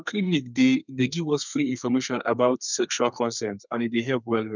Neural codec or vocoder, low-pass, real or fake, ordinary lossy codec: codec, 32 kHz, 1.9 kbps, SNAC; 7.2 kHz; fake; none